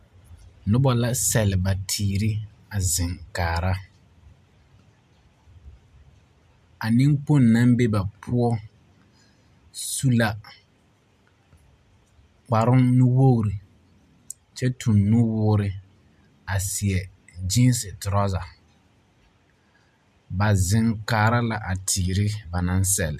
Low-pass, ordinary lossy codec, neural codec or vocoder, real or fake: 14.4 kHz; MP3, 96 kbps; none; real